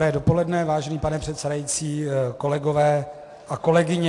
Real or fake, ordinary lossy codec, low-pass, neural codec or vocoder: real; AAC, 48 kbps; 10.8 kHz; none